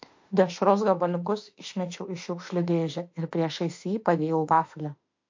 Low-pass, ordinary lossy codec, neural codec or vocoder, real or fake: 7.2 kHz; MP3, 48 kbps; autoencoder, 48 kHz, 32 numbers a frame, DAC-VAE, trained on Japanese speech; fake